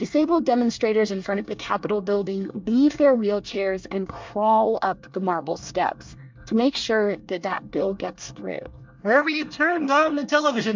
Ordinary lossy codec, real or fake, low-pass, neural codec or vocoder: MP3, 64 kbps; fake; 7.2 kHz; codec, 24 kHz, 1 kbps, SNAC